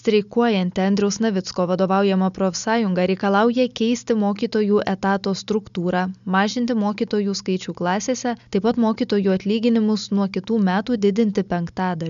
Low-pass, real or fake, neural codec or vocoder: 7.2 kHz; real; none